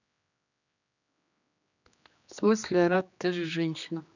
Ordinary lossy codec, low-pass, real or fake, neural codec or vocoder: none; 7.2 kHz; fake; codec, 16 kHz, 2 kbps, X-Codec, HuBERT features, trained on general audio